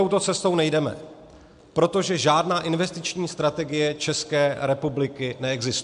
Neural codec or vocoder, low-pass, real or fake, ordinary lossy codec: none; 10.8 kHz; real; MP3, 64 kbps